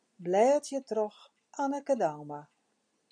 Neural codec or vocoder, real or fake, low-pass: none; real; 9.9 kHz